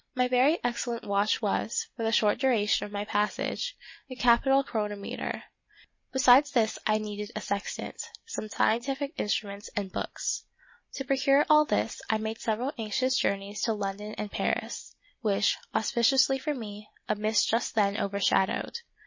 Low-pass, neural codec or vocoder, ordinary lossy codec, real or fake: 7.2 kHz; none; MP3, 32 kbps; real